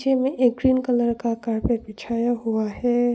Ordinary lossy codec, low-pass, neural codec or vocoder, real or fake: none; none; none; real